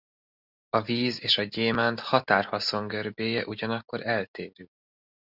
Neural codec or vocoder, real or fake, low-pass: none; real; 5.4 kHz